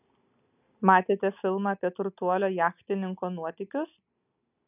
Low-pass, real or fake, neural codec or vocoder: 3.6 kHz; real; none